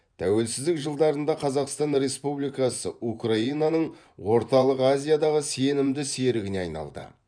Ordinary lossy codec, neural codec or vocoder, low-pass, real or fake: none; vocoder, 24 kHz, 100 mel bands, Vocos; 9.9 kHz; fake